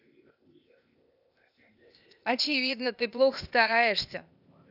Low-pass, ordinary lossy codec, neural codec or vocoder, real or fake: 5.4 kHz; none; codec, 16 kHz, 0.8 kbps, ZipCodec; fake